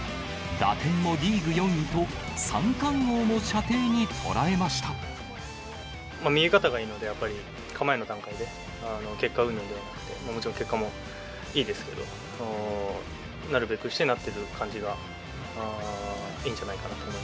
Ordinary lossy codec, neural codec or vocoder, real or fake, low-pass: none; none; real; none